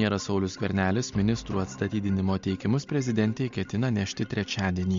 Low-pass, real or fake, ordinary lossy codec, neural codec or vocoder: 7.2 kHz; real; MP3, 48 kbps; none